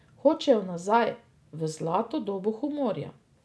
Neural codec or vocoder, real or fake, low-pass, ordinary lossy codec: none; real; none; none